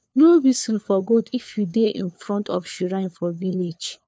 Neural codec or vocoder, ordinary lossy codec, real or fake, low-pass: codec, 16 kHz, 4 kbps, FunCodec, trained on LibriTTS, 50 frames a second; none; fake; none